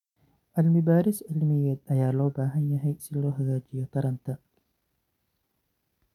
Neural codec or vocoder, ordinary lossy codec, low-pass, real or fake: none; none; 19.8 kHz; real